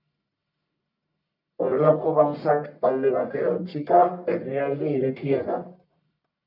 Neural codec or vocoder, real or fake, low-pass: codec, 44.1 kHz, 1.7 kbps, Pupu-Codec; fake; 5.4 kHz